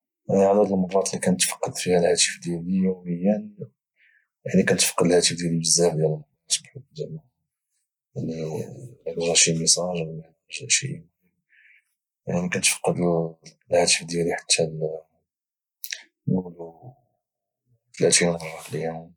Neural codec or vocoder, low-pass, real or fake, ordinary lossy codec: none; 19.8 kHz; real; none